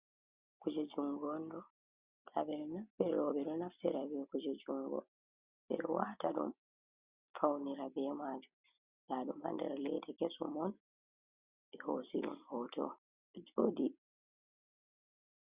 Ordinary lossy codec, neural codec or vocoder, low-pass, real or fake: Opus, 64 kbps; none; 3.6 kHz; real